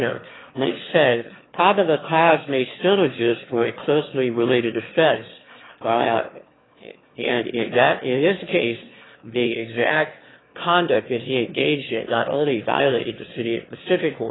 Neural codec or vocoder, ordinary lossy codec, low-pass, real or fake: autoencoder, 22.05 kHz, a latent of 192 numbers a frame, VITS, trained on one speaker; AAC, 16 kbps; 7.2 kHz; fake